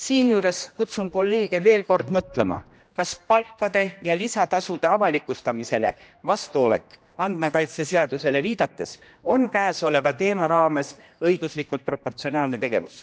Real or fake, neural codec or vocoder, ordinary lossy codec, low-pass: fake; codec, 16 kHz, 1 kbps, X-Codec, HuBERT features, trained on general audio; none; none